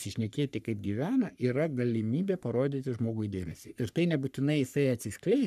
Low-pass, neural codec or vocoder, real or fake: 14.4 kHz; codec, 44.1 kHz, 3.4 kbps, Pupu-Codec; fake